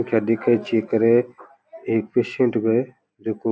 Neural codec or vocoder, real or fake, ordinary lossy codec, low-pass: none; real; none; none